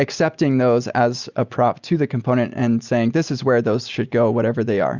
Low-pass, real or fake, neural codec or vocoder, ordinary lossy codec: 7.2 kHz; real; none; Opus, 64 kbps